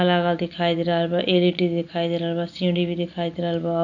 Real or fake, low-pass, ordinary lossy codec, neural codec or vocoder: real; 7.2 kHz; none; none